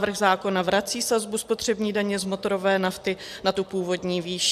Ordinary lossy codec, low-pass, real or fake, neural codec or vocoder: AAC, 96 kbps; 14.4 kHz; real; none